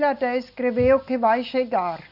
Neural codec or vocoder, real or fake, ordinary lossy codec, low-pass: none; real; none; 5.4 kHz